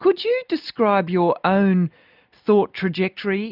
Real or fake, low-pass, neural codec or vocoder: real; 5.4 kHz; none